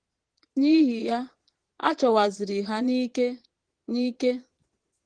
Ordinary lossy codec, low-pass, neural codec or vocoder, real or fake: Opus, 16 kbps; 9.9 kHz; vocoder, 22.05 kHz, 80 mel bands, Vocos; fake